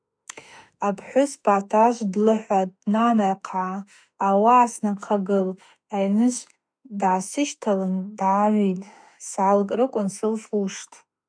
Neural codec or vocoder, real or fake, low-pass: autoencoder, 48 kHz, 32 numbers a frame, DAC-VAE, trained on Japanese speech; fake; 9.9 kHz